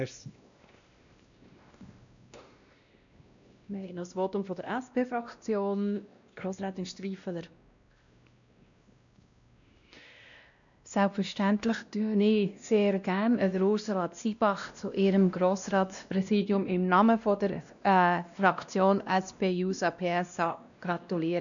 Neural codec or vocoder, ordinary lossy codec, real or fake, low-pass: codec, 16 kHz, 1 kbps, X-Codec, WavLM features, trained on Multilingual LibriSpeech; none; fake; 7.2 kHz